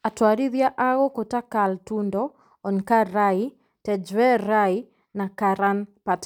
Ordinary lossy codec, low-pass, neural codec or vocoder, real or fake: none; 19.8 kHz; none; real